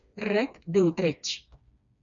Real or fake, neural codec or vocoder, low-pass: fake; codec, 16 kHz, 2 kbps, FreqCodec, smaller model; 7.2 kHz